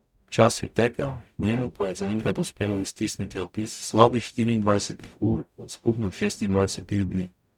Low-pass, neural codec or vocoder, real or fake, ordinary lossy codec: 19.8 kHz; codec, 44.1 kHz, 0.9 kbps, DAC; fake; none